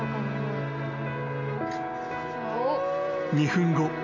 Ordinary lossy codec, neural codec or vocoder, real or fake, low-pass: none; none; real; 7.2 kHz